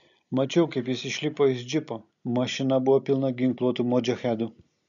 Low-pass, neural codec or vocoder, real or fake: 7.2 kHz; none; real